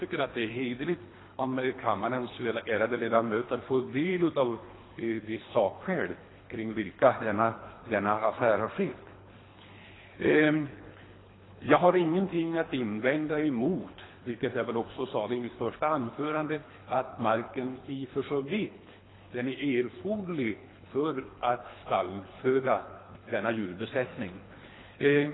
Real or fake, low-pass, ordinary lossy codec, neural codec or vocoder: fake; 7.2 kHz; AAC, 16 kbps; codec, 24 kHz, 3 kbps, HILCodec